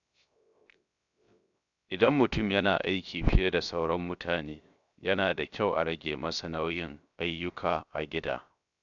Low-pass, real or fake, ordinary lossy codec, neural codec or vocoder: 7.2 kHz; fake; none; codec, 16 kHz, 0.7 kbps, FocalCodec